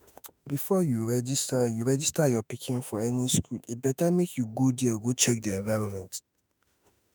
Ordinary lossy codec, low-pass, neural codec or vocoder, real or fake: none; none; autoencoder, 48 kHz, 32 numbers a frame, DAC-VAE, trained on Japanese speech; fake